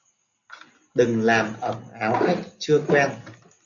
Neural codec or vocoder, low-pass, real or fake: none; 7.2 kHz; real